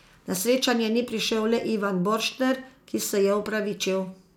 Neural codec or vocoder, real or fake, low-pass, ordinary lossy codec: none; real; 19.8 kHz; none